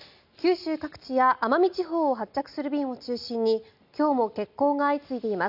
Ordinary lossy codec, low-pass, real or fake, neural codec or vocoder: none; 5.4 kHz; real; none